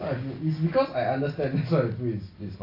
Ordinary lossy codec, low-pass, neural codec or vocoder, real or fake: AAC, 32 kbps; 5.4 kHz; none; real